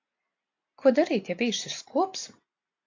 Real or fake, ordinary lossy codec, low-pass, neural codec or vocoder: real; AAC, 48 kbps; 7.2 kHz; none